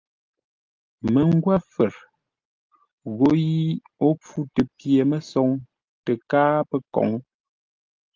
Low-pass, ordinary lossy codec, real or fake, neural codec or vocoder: 7.2 kHz; Opus, 32 kbps; real; none